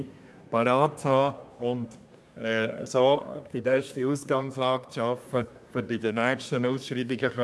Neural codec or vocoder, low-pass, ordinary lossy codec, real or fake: codec, 24 kHz, 1 kbps, SNAC; none; none; fake